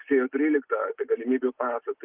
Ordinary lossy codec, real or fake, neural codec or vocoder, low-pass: Opus, 32 kbps; real; none; 3.6 kHz